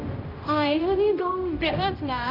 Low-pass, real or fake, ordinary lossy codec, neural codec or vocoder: 5.4 kHz; fake; none; codec, 16 kHz, 0.5 kbps, X-Codec, HuBERT features, trained on general audio